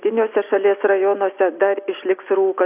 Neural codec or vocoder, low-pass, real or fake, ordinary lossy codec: none; 3.6 kHz; real; AAC, 32 kbps